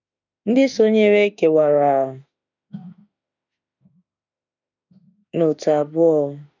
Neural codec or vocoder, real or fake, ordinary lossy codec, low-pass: autoencoder, 48 kHz, 32 numbers a frame, DAC-VAE, trained on Japanese speech; fake; none; 7.2 kHz